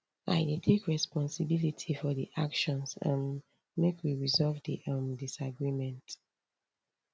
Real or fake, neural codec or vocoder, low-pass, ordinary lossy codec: real; none; none; none